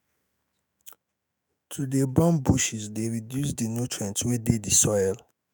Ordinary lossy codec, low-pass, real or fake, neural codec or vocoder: none; none; fake; autoencoder, 48 kHz, 128 numbers a frame, DAC-VAE, trained on Japanese speech